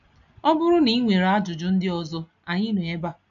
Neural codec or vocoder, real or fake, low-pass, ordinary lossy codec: none; real; 7.2 kHz; none